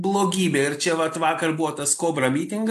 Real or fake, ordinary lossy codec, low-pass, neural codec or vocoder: real; AAC, 64 kbps; 14.4 kHz; none